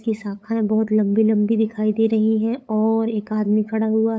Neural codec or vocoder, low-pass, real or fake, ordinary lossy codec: codec, 16 kHz, 8 kbps, FunCodec, trained on LibriTTS, 25 frames a second; none; fake; none